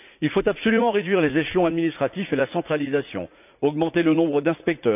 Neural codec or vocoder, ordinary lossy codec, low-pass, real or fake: vocoder, 44.1 kHz, 80 mel bands, Vocos; none; 3.6 kHz; fake